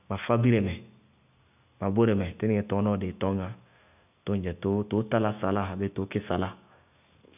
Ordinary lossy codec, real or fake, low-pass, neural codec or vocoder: none; real; 3.6 kHz; none